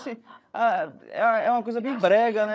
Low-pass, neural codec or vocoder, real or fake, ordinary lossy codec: none; codec, 16 kHz, 8 kbps, FreqCodec, larger model; fake; none